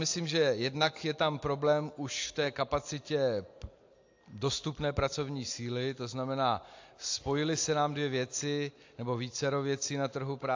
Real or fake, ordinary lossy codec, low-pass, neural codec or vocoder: real; AAC, 48 kbps; 7.2 kHz; none